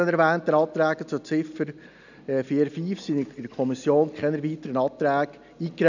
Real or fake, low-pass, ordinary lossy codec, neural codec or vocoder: real; 7.2 kHz; none; none